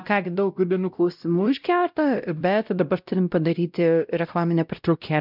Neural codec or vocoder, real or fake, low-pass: codec, 16 kHz, 0.5 kbps, X-Codec, WavLM features, trained on Multilingual LibriSpeech; fake; 5.4 kHz